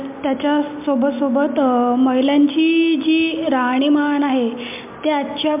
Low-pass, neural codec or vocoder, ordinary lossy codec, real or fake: 3.6 kHz; none; MP3, 32 kbps; real